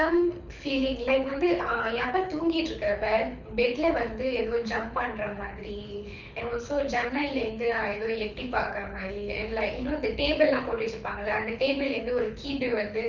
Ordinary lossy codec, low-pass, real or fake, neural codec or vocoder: none; 7.2 kHz; fake; codec, 24 kHz, 6 kbps, HILCodec